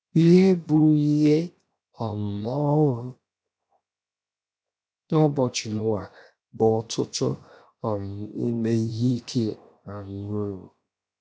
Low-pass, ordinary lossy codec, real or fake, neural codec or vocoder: none; none; fake; codec, 16 kHz, 0.7 kbps, FocalCodec